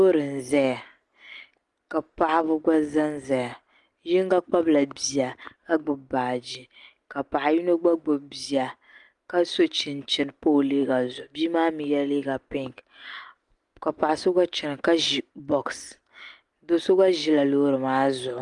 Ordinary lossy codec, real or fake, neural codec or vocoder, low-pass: Opus, 32 kbps; real; none; 10.8 kHz